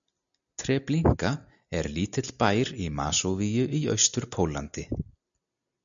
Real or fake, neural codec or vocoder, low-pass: real; none; 7.2 kHz